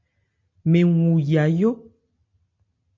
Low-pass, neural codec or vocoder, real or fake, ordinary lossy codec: 7.2 kHz; none; real; MP3, 48 kbps